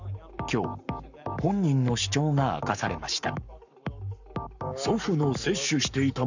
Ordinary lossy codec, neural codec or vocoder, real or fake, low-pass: none; codec, 44.1 kHz, 7.8 kbps, Pupu-Codec; fake; 7.2 kHz